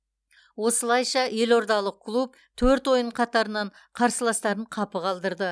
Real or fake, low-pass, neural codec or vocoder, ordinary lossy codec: real; none; none; none